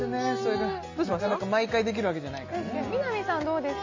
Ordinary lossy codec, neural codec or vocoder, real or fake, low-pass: MP3, 32 kbps; none; real; 7.2 kHz